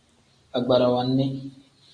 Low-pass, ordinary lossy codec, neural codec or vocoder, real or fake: 9.9 kHz; AAC, 64 kbps; none; real